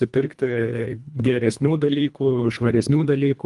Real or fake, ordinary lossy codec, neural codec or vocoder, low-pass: fake; Opus, 32 kbps; codec, 24 kHz, 1.5 kbps, HILCodec; 10.8 kHz